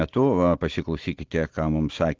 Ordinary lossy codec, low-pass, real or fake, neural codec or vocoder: Opus, 16 kbps; 7.2 kHz; real; none